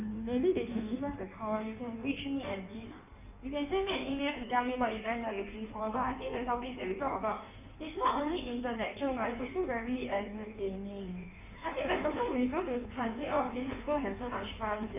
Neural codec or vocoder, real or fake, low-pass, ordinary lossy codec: codec, 16 kHz in and 24 kHz out, 1.1 kbps, FireRedTTS-2 codec; fake; 3.6 kHz; none